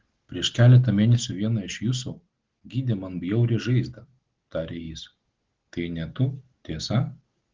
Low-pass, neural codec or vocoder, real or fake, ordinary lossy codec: 7.2 kHz; none; real; Opus, 16 kbps